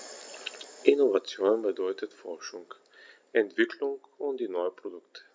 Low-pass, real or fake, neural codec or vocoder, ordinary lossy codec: none; real; none; none